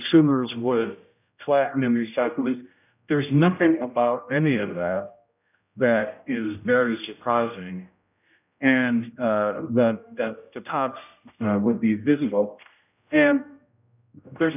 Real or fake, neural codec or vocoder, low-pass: fake; codec, 16 kHz, 0.5 kbps, X-Codec, HuBERT features, trained on general audio; 3.6 kHz